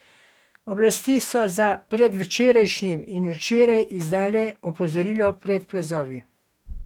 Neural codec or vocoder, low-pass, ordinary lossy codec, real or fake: codec, 44.1 kHz, 2.6 kbps, DAC; 19.8 kHz; none; fake